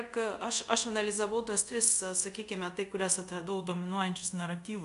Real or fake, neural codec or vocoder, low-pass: fake; codec, 24 kHz, 0.5 kbps, DualCodec; 10.8 kHz